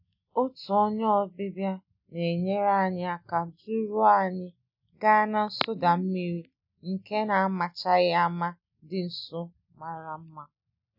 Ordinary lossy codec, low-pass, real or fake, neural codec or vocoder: none; 5.4 kHz; real; none